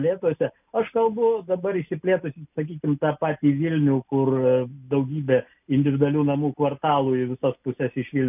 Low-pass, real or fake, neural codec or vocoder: 3.6 kHz; real; none